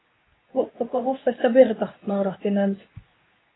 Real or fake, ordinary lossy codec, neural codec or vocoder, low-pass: fake; AAC, 16 kbps; codec, 24 kHz, 0.9 kbps, WavTokenizer, medium speech release version 2; 7.2 kHz